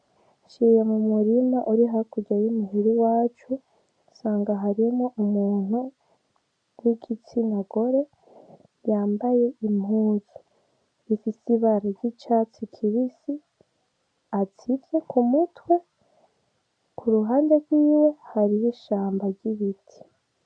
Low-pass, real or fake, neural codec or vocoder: 9.9 kHz; real; none